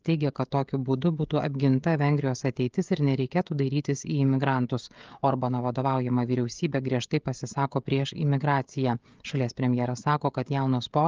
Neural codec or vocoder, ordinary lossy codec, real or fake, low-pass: codec, 16 kHz, 16 kbps, FreqCodec, smaller model; Opus, 32 kbps; fake; 7.2 kHz